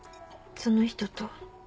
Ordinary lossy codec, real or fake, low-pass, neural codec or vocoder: none; real; none; none